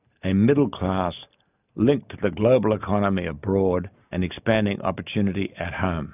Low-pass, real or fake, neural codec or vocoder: 3.6 kHz; real; none